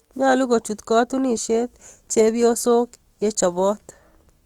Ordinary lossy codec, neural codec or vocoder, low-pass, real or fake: Opus, 24 kbps; autoencoder, 48 kHz, 128 numbers a frame, DAC-VAE, trained on Japanese speech; 19.8 kHz; fake